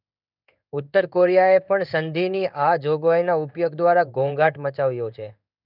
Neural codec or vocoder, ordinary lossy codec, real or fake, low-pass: codec, 16 kHz in and 24 kHz out, 1 kbps, XY-Tokenizer; none; fake; 5.4 kHz